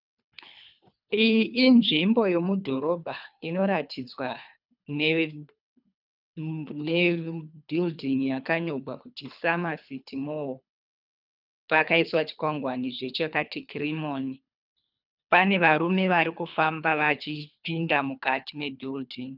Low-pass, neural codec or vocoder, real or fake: 5.4 kHz; codec, 24 kHz, 3 kbps, HILCodec; fake